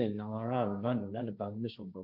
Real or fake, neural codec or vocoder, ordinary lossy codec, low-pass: fake; codec, 16 kHz, 1.1 kbps, Voila-Tokenizer; none; 5.4 kHz